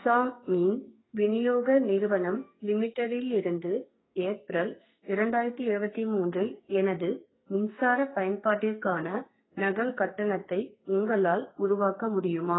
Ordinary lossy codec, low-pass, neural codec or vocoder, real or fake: AAC, 16 kbps; 7.2 kHz; codec, 44.1 kHz, 2.6 kbps, SNAC; fake